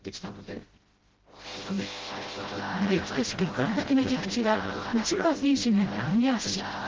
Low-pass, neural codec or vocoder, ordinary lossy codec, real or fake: 7.2 kHz; codec, 16 kHz, 0.5 kbps, FreqCodec, smaller model; Opus, 16 kbps; fake